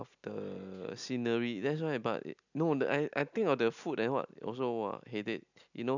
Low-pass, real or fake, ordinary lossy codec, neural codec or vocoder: 7.2 kHz; real; none; none